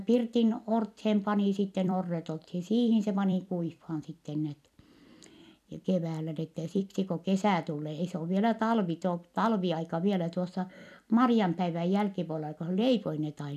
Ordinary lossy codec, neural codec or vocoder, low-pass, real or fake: none; vocoder, 44.1 kHz, 128 mel bands every 256 samples, BigVGAN v2; 14.4 kHz; fake